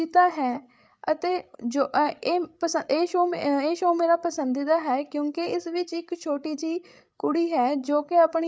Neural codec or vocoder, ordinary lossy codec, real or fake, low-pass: codec, 16 kHz, 8 kbps, FreqCodec, larger model; none; fake; none